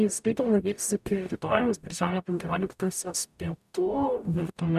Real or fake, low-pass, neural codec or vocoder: fake; 14.4 kHz; codec, 44.1 kHz, 0.9 kbps, DAC